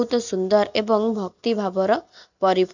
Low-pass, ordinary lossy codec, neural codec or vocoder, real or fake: 7.2 kHz; none; none; real